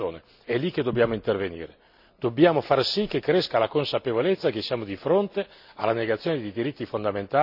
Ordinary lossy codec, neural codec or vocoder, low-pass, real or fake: none; none; 5.4 kHz; real